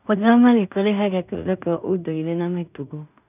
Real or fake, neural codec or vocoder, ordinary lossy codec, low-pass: fake; codec, 16 kHz in and 24 kHz out, 0.4 kbps, LongCat-Audio-Codec, two codebook decoder; none; 3.6 kHz